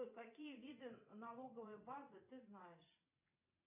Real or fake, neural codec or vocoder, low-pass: fake; vocoder, 22.05 kHz, 80 mel bands, WaveNeXt; 3.6 kHz